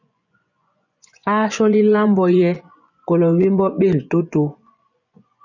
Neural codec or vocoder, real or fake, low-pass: vocoder, 24 kHz, 100 mel bands, Vocos; fake; 7.2 kHz